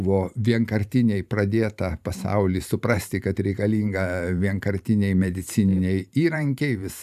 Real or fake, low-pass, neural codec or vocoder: fake; 14.4 kHz; vocoder, 44.1 kHz, 128 mel bands every 512 samples, BigVGAN v2